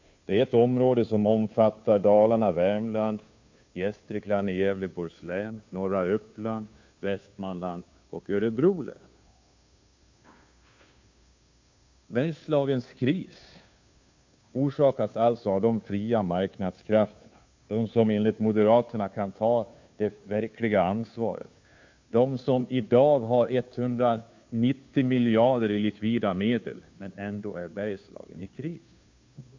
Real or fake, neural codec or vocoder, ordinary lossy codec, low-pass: fake; codec, 16 kHz, 2 kbps, FunCodec, trained on Chinese and English, 25 frames a second; MP3, 48 kbps; 7.2 kHz